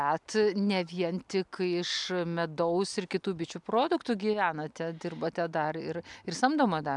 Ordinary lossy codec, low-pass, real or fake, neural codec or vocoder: MP3, 96 kbps; 10.8 kHz; real; none